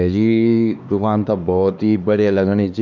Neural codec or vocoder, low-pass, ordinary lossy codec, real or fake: codec, 16 kHz, 2 kbps, X-Codec, HuBERT features, trained on LibriSpeech; 7.2 kHz; none; fake